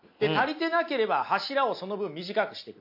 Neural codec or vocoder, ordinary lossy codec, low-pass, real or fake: none; MP3, 32 kbps; 5.4 kHz; real